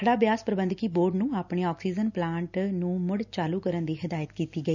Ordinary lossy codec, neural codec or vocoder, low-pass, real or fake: none; none; 7.2 kHz; real